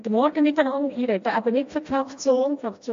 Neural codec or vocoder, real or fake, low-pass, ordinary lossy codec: codec, 16 kHz, 1 kbps, FreqCodec, smaller model; fake; 7.2 kHz; AAC, 48 kbps